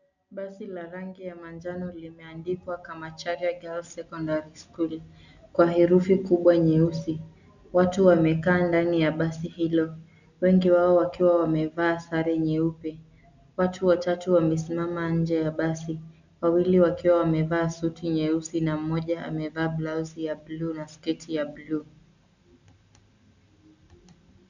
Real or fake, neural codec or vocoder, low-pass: real; none; 7.2 kHz